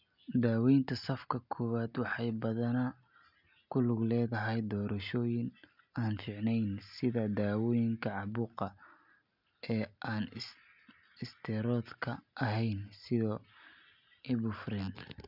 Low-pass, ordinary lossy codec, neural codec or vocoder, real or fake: 5.4 kHz; none; none; real